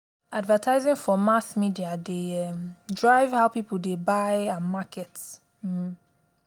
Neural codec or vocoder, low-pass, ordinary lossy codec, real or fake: none; none; none; real